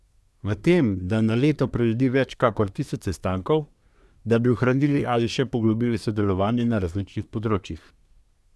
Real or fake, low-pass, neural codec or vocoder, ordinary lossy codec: fake; none; codec, 24 kHz, 1 kbps, SNAC; none